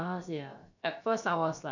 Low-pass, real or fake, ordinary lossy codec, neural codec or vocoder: 7.2 kHz; fake; none; codec, 16 kHz, about 1 kbps, DyCAST, with the encoder's durations